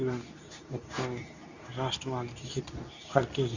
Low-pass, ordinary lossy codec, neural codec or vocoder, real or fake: 7.2 kHz; none; codec, 24 kHz, 0.9 kbps, WavTokenizer, medium speech release version 2; fake